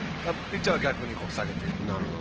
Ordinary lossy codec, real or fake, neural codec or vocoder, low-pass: Opus, 16 kbps; real; none; 7.2 kHz